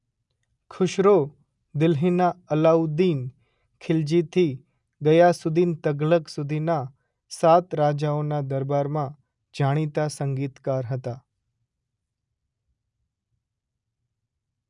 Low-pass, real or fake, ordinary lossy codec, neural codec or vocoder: 10.8 kHz; real; none; none